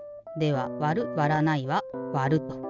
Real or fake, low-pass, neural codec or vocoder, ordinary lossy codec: real; 7.2 kHz; none; none